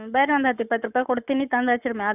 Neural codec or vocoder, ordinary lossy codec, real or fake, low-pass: codec, 44.1 kHz, 7.8 kbps, Pupu-Codec; none; fake; 3.6 kHz